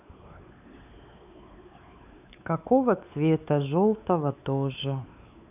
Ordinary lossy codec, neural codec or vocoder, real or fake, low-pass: none; codec, 16 kHz, 4 kbps, X-Codec, WavLM features, trained on Multilingual LibriSpeech; fake; 3.6 kHz